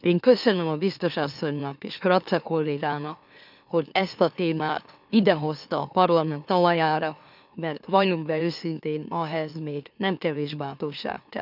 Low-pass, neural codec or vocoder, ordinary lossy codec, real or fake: 5.4 kHz; autoencoder, 44.1 kHz, a latent of 192 numbers a frame, MeloTTS; none; fake